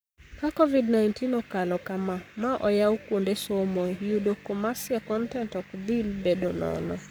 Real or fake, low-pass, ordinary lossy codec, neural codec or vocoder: fake; none; none; codec, 44.1 kHz, 7.8 kbps, Pupu-Codec